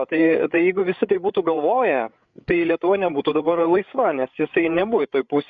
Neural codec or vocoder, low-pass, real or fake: codec, 16 kHz, 8 kbps, FreqCodec, larger model; 7.2 kHz; fake